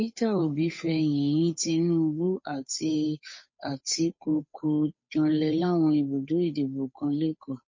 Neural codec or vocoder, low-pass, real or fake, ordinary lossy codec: codec, 16 kHz, 8 kbps, FunCodec, trained on Chinese and English, 25 frames a second; 7.2 kHz; fake; MP3, 32 kbps